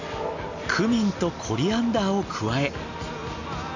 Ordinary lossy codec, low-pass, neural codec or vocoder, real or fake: none; 7.2 kHz; none; real